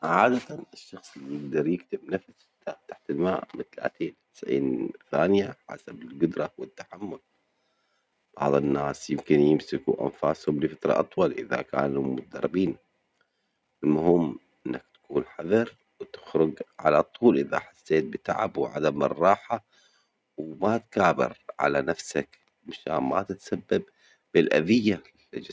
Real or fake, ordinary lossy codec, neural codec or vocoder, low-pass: real; none; none; none